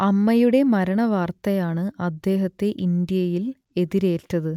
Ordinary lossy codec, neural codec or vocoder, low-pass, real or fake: none; none; 19.8 kHz; real